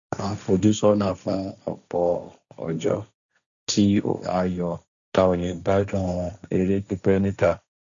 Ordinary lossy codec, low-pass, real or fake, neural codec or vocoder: none; 7.2 kHz; fake; codec, 16 kHz, 1.1 kbps, Voila-Tokenizer